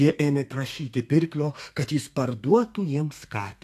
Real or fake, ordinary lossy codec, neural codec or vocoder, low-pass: fake; AAC, 64 kbps; codec, 32 kHz, 1.9 kbps, SNAC; 14.4 kHz